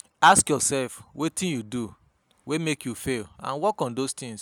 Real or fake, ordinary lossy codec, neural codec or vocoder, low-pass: real; none; none; none